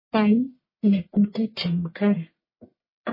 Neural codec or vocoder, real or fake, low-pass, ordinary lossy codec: codec, 44.1 kHz, 1.7 kbps, Pupu-Codec; fake; 5.4 kHz; MP3, 24 kbps